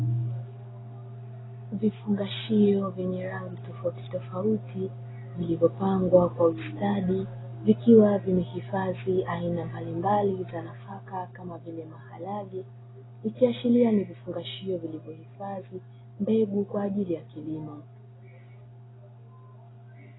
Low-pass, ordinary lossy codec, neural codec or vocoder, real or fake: 7.2 kHz; AAC, 16 kbps; none; real